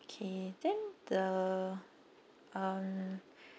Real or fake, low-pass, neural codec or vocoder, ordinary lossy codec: real; none; none; none